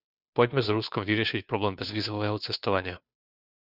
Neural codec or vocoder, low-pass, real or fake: codec, 16 kHz, 2 kbps, FunCodec, trained on Chinese and English, 25 frames a second; 5.4 kHz; fake